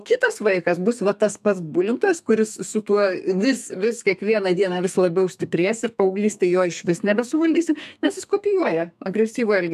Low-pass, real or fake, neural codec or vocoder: 14.4 kHz; fake; codec, 32 kHz, 1.9 kbps, SNAC